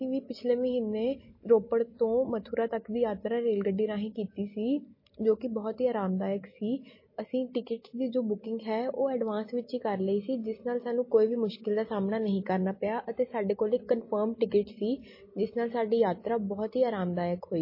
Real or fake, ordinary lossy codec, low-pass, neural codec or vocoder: real; MP3, 24 kbps; 5.4 kHz; none